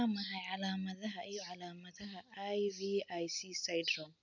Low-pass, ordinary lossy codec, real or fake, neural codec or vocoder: 7.2 kHz; none; real; none